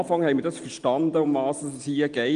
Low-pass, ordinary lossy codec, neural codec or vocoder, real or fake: 9.9 kHz; Opus, 24 kbps; none; real